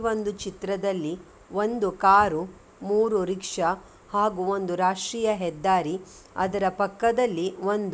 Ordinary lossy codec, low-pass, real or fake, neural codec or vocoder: none; none; real; none